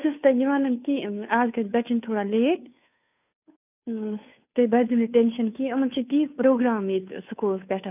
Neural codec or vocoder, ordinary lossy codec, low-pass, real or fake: codec, 16 kHz, 2 kbps, FunCodec, trained on Chinese and English, 25 frames a second; none; 3.6 kHz; fake